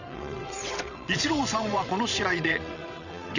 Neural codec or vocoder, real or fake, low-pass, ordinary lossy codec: vocoder, 22.05 kHz, 80 mel bands, WaveNeXt; fake; 7.2 kHz; none